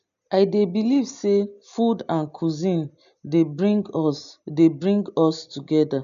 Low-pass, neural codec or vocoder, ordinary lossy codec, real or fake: 7.2 kHz; none; none; real